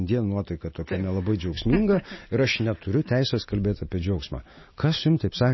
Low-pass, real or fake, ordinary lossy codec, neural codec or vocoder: 7.2 kHz; real; MP3, 24 kbps; none